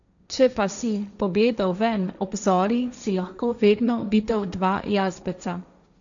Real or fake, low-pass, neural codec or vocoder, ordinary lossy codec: fake; 7.2 kHz; codec, 16 kHz, 1.1 kbps, Voila-Tokenizer; none